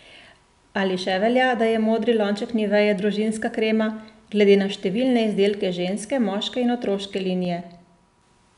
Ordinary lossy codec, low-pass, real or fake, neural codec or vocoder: none; 10.8 kHz; real; none